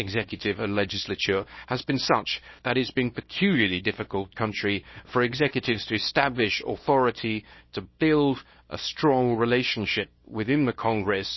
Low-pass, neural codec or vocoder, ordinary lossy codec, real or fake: 7.2 kHz; codec, 24 kHz, 0.9 kbps, WavTokenizer, small release; MP3, 24 kbps; fake